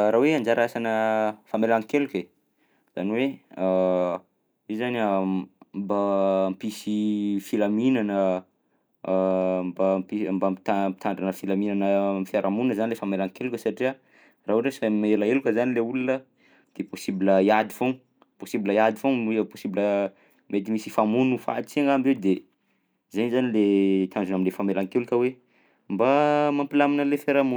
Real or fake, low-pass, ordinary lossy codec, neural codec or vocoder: real; none; none; none